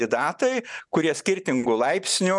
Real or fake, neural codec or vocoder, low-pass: real; none; 10.8 kHz